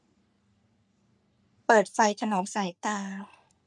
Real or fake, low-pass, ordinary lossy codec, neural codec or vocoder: fake; 10.8 kHz; none; codec, 44.1 kHz, 3.4 kbps, Pupu-Codec